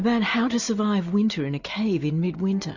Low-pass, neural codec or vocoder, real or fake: 7.2 kHz; none; real